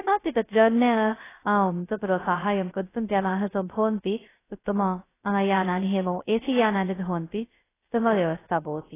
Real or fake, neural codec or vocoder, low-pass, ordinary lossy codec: fake; codec, 16 kHz, 0.2 kbps, FocalCodec; 3.6 kHz; AAC, 16 kbps